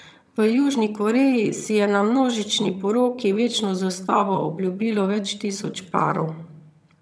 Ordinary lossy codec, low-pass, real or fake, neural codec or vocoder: none; none; fake; vocoder, 22.05 kHz, 80 mel bands, HiFi-GAN